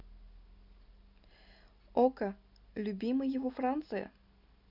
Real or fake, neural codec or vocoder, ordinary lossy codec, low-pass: real; none; none; 5.4 kHz